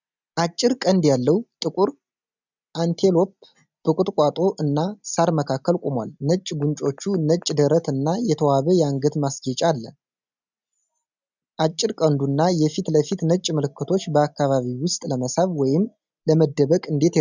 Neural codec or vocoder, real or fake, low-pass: none; real; 7.2 kHz